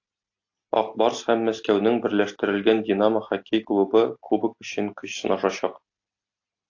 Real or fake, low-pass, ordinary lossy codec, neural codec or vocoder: real; 7.2 kHz; AAC, 48 kbps; none